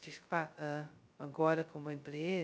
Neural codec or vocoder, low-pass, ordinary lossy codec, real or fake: codec, 16 kHz, 0.2 kbps, FocalCodec; none; none; fake